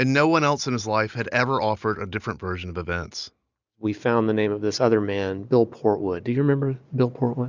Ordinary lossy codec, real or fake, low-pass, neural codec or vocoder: Opus, 64 kbps; real; 7.2 kHz; none